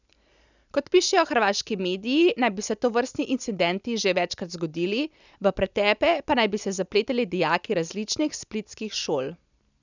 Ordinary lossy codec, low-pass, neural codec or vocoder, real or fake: none; 7.2 kHz; none; real